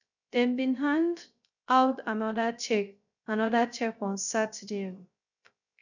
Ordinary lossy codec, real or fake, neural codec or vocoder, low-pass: none; fake; codec, 16 kHz, 0.3 kbps, FocalCodec; 7.2 kHz